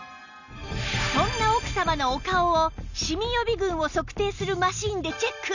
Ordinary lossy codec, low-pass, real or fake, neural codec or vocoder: none; 7.2 kHz; real; none